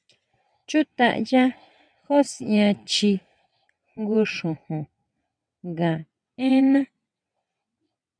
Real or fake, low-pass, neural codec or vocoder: fake; 9.9 kHz; vocoder, 22.05 kHz, 80 mel bands, WaveNeXt